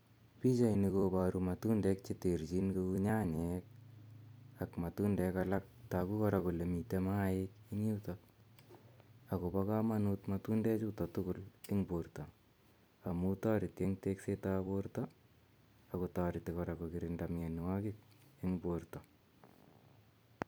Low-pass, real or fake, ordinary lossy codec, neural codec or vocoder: none; fake; none; vocoder, 44.1 kHz, 128 mel bands every 256 samples, BigVGAN v2